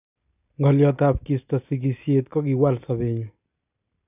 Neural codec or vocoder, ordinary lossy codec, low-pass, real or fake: none; none; 3.6 kHz; real